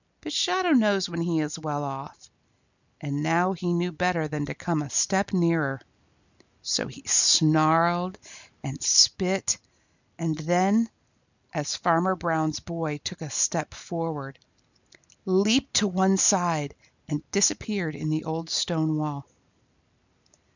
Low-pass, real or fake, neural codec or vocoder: 7.2 kHz; real; none